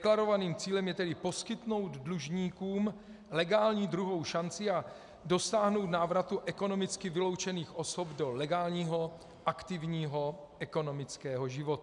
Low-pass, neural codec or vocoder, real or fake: 10.8 kHz; none; real